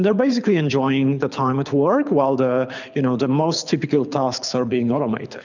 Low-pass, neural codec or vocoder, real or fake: 7.2 kHz; codec, 24 kHz, 6 kbps, HILCodec; fake